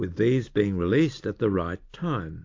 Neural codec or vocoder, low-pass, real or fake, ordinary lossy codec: none; 7.2 kHz; real; AAC, 48 kbps